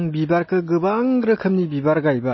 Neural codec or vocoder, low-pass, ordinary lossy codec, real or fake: none; 7.2 kHz; MP3, 24 kbps; real